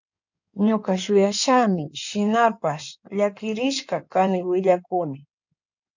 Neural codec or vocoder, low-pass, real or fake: codec, 16 kHz in and 24 kHz out, 2.2 kbps, FireRedTTS-2 codec; 7.2 kHz; fake